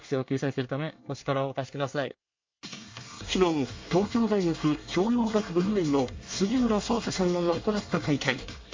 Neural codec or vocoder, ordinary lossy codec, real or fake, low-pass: codec, 24 kHz, 1 kbps, SNAC; MP3, 48 kbps; fake; 7.2 kHz